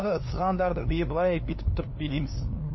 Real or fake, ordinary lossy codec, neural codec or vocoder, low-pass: fake; MP3, 24 kbps; codec, 16 kHz, 2 kbps, FunCodec, trained on LibriTTS, 25 frames a second; 7.2 kHz